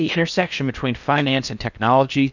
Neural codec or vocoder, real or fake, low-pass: codec, 16 kHz in and 24 kHz out, 0.8 kbps, FocalCodec, streaming, 65536 codes; fake; 7.2 kHz